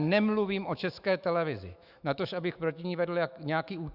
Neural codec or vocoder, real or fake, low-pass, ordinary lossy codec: autoencoder, 48 kHz, 128 numbers a frame, DAC-VAE, trained on Japanese speech; fake; 5.4 kHz; Opus, 64 kbps